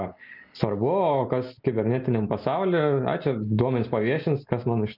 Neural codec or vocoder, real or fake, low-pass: none; real; 5.4 kHz